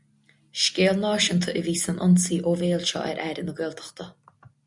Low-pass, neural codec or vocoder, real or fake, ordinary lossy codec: 10.8 kHz; none; real; MP3, 96 kbps